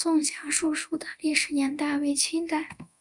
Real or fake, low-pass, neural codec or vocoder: fake; 10.8 kHz; codec, 24 kHz, 0.9 kbps, DualCodec